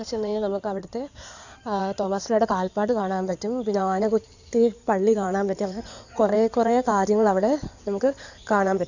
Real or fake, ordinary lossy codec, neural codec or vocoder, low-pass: fake; none; codec, 16 kHz in and 24 kHz out, 2.2 kbps, FireRedTTS-2 codec; 7.2 kHz